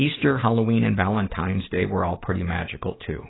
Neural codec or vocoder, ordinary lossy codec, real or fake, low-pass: codec, 16 kHz, 6 kbps, DAC; AAC, 16 kbps; fake; 7.2 kHz